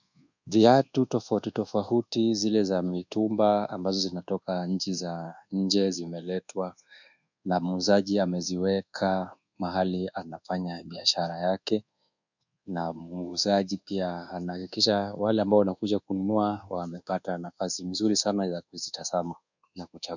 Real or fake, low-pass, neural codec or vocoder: fake; 7.2 kHz; codec, 24 kHz, 1.2 kbps, DualCodec